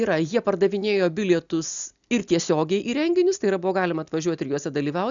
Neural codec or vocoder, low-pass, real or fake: none; 7.2 kHz; real